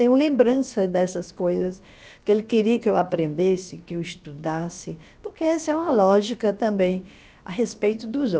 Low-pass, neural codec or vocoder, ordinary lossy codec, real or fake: none; codec, 16 kHz, about 1 kbps, DyCAST, with the encoder's durations; none; fake